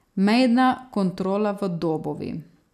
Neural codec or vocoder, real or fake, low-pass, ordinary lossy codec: none; real; 14.4 kHz; none